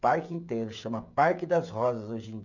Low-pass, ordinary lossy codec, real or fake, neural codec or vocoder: 7.2 kHz; none; fake; codec, 16 kHz, 16 kbps, FreqCodec, smaller model